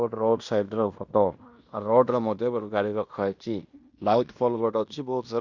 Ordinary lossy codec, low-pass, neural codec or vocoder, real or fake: Opus, 64 kbps; 7.2 kHz; codec, 16 kHz in and 24 kHz out, 0.9 kbps, LongCat-Audio-Codec, fine tuned four codebook decoder; fake